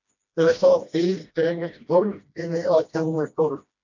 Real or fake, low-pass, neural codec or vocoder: fake; 7.2 kHz; codec, 16 kHz, 1 kbps, FreqCodec, smaller model